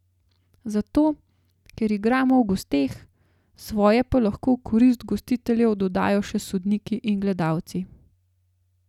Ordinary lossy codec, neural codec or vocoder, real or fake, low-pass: none; none; real; 19.8 kHz